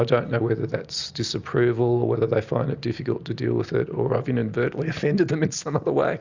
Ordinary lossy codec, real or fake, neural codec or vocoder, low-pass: Opus, 64 kbps; real; none; 7.2 kHz